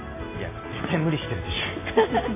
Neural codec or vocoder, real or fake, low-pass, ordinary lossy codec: none; real; 3.6 kHz; AAC, 24 kbps